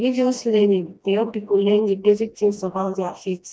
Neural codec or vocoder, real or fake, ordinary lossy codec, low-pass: codec, 16 kHz, 1 kbps, FreqCodec, smaller model; fake; none; none